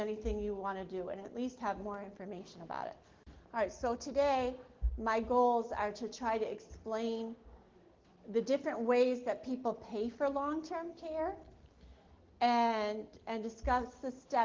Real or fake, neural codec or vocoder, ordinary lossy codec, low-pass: real; none; Opus, 16 kbps; 7.2 kHz